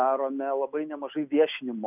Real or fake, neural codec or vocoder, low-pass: real; none; 3.6 kHz